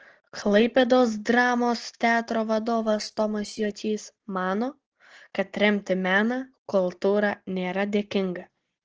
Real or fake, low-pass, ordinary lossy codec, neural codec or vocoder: real; 7.2 kHz; Opus, 16 kbps; none